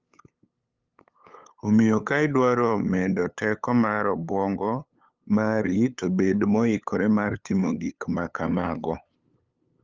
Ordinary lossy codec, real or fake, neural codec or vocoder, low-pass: Opus, 32 kbps; fake; codec, 16 kHz, 8 kbps, FunCodec, trained on LibriTTS, 25 frames a second; 7.2 kHz